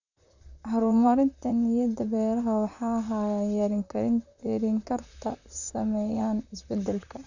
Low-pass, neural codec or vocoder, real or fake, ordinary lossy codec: 7.2 kHz; vocoder, 44.1 kHz, 128 mel bands, Pupu-Vocoder; fake; none